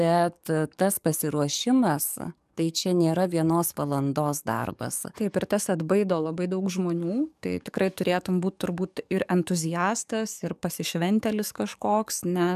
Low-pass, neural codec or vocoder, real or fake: 14.4 kHz; codec, 44.1 kHz, 7.8 kbps, DAC; fake